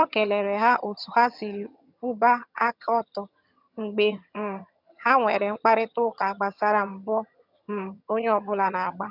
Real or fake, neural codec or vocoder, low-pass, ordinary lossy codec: fake; vocoder, 22.05 kHz, 80 mel bands, HiFi-GAN; 5.4 kHz; none